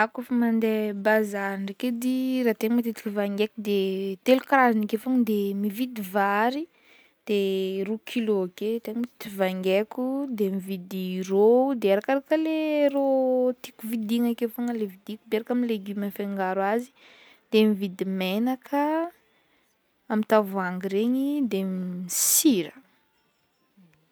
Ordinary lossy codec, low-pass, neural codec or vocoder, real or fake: none; none; none; real